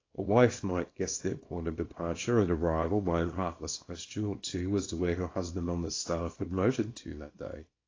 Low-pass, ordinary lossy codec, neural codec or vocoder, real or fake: 7.2 kHz; AAC, 32 kbps; codec, 24 kHz, 0.9 kbps, WavTokenizer, small release; fake